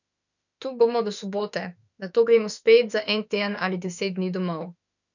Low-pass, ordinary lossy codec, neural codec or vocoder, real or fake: 7.2 kHz; none; autoencoder, 48 kHz, 32 numbers a frame, DAC-VAE, trained on Japanese speech; fake